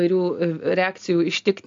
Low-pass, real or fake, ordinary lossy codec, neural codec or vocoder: 7.2 kHz; real; AAC, 64 kbps; none